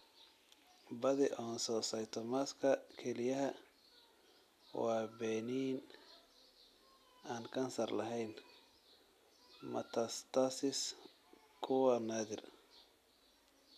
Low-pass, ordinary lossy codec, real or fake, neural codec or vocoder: 14.4 kHz; none; real; none